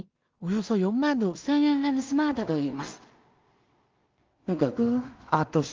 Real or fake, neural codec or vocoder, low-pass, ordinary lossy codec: fake; codec, 16 kHz in and 24 kHz out, 0.4 kbps, LongCat-Audio-Codec, two codebook decoder; 7.2 kHz; Opus, 32 kbps